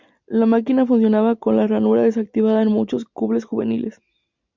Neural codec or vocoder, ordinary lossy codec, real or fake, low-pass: none; MP3, 64 kbps; real; 7.2 kHz